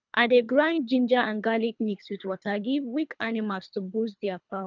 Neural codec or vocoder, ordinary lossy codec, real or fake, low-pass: codec, 24 kHz, 3 kbps, HILCodec; none; fake; 7.2 kHz